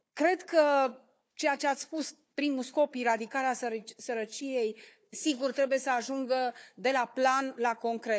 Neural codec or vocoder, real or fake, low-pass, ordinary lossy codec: codec, 16 kHz, 4 kbps, FunCodec, trained on Chinese and English, 50 frames a second; fake; none; none